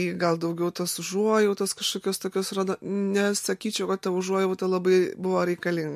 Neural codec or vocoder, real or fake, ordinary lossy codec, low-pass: none; real; MP3, 64 kbps; 14.4 kHz